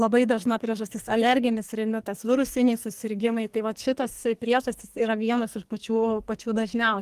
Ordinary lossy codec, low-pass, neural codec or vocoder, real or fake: Opus, 24 kbps; 14.4 kHz; codec, 44.1 kHz, 2.6 kbps, SNAC; fake